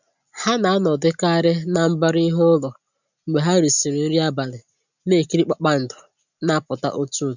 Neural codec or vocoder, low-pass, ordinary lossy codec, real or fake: none; 7.2 kHz; none; real